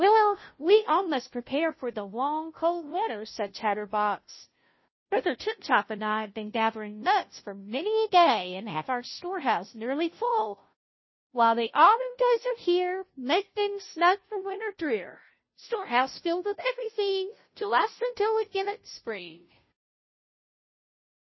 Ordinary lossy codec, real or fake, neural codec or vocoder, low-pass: MP3, 24 kbps; fake; codec, 16 kHz, 0.5 kbps, FunCodec, trained on Chinese and English, 25 frames a second; 7.2 kHz